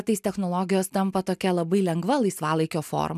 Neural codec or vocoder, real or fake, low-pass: none; real; 14.4 kHz